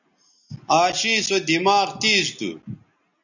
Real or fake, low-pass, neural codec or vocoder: real; 7.2 kHz; none